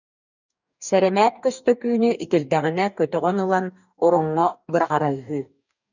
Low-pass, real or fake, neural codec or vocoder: 7.2 kHz; fake; codec, 44.1 kHz, 2.6 kbps, DAC